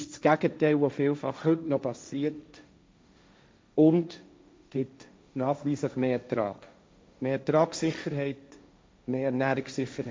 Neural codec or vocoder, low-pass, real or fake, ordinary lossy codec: codec, 16 kHz, 1.1 kbps, Voila-Tokenizer; none; fake; none